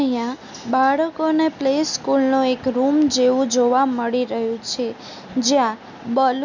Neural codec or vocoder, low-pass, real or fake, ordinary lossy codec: none; 7.2 kHz; real; none